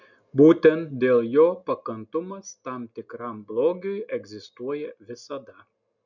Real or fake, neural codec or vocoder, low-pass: real; none; 7.2 kHz